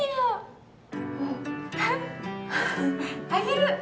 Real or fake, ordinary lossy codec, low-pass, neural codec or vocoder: real; none; none; none